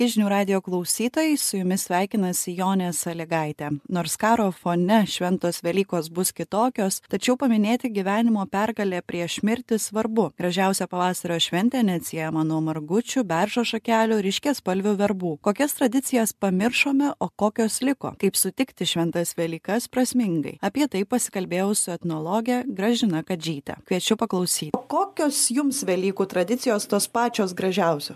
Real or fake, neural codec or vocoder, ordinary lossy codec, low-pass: real; none; MP3, 96 kbps; 14.4 kHz